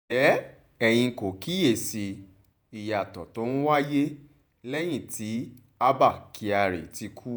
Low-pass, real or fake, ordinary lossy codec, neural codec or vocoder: none; fake; none; vocoder, 48 kHz, 128 mel bands, Vocos